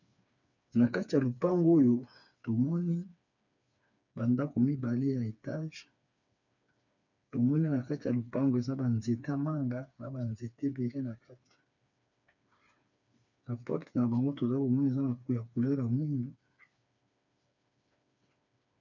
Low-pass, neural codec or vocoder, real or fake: 7.2 kHz; codec, 16 kHz, 4 kbps, FreqCodec, smaller model; fake